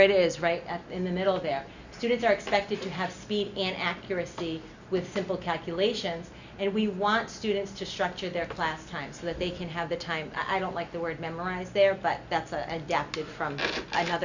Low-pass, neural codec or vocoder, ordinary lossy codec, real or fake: 7.2 kHz; none; Opus, 64 kbps; real